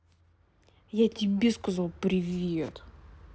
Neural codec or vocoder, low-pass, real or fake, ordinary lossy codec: none; none; real; none